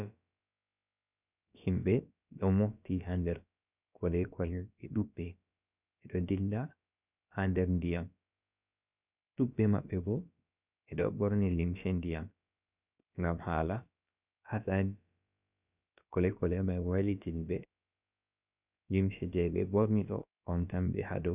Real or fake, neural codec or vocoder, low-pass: fake; codec, 16 kHz, about 1 kbps, DyCAST, with the encoder's durations; 3.6 kHz